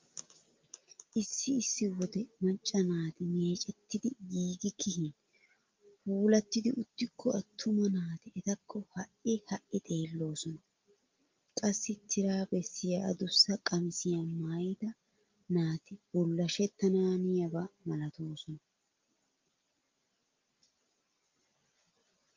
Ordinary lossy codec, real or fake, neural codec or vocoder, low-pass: Opus, 32 kbps; real; none; 7.2 kHz